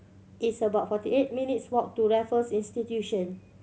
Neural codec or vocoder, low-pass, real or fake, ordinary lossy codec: none; none; real; none